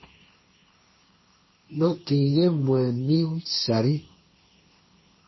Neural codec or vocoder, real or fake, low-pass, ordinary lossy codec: codec, 16 kHz, 1.1 kbps, Voila-Tokenizer; fake; 7.2 kHz; MP3, 24 kbps